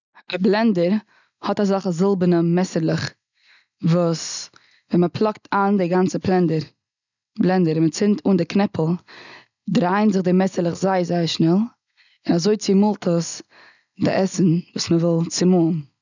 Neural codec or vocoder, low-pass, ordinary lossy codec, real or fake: none; 7.2 kHz; none; real